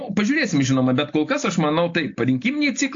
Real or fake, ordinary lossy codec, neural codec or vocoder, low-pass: real; AAC, 48 kbps; none; 7.2 kHz